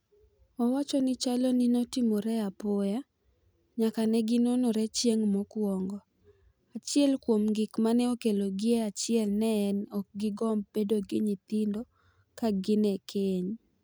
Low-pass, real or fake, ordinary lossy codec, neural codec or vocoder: none; real; none; none